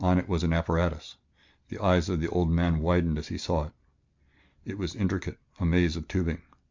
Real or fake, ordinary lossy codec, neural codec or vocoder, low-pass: real; MP3, 64 kbps; none; 7.2 kHz